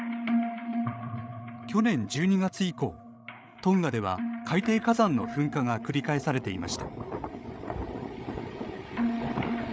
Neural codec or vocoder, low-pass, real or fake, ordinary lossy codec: codec, 16 kHz, 8 kbps, FreqCodec, larger model; none; fake; none